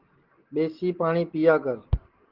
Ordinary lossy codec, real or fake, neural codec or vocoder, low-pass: Opus, 16 kbps; real; none; 5.4 kHz